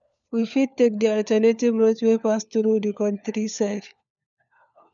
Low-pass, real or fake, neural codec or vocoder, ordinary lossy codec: 7.2 kHz; fake; codec, 16 kHz, 4 kbps, FunCodec, trained on LibriTTS, 50 frames a second; none